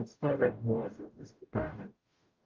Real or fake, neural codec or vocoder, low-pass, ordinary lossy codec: fake; codec, 44.1 kHz, 0.9 kbps, DAC; 7.2 kHz; Opus, 24 kbps